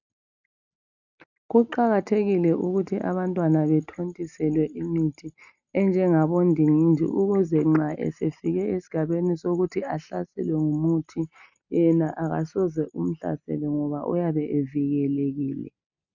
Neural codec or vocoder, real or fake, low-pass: none; real; 7.2 kHz